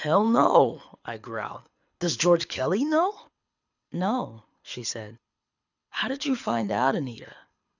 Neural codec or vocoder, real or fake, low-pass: codec, 24 kHz, 6 kbps, HILCodec; fake; 7.2 kHz